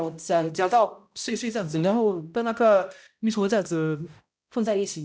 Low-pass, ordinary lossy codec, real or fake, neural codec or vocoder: none; none; fake; codec, 16 kHz, 0.5 kbps, X-Codec, HuBERT features, trained on balanced general audio